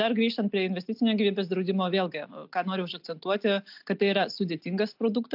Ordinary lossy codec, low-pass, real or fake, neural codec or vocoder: AAC, 48 kbps; 5.4 kHz; real; none